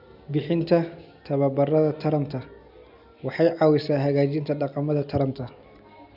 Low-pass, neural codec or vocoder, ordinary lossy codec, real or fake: 5.4 kHz; none; none; real